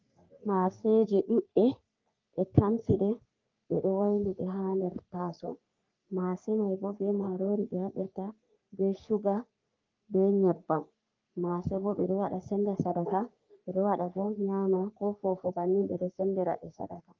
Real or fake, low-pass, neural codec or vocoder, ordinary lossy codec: fake; 7.2 kHz; codec, 44.1 kHz, 3.4 kbps, Pupu-Codec; Opus, 32 kbps